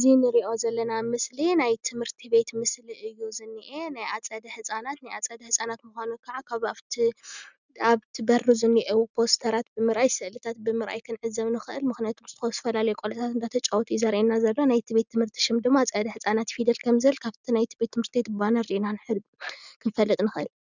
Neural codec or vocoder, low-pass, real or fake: none; 7.2 kHz; real